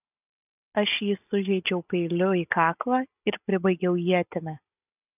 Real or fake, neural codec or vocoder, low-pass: real; none; 3.6 kHz